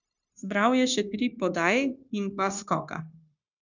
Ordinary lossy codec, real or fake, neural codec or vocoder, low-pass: none; fake; codec, 16 kHz, 0.9 kbps, LongCat-Audio-Codec; 7.2 kHz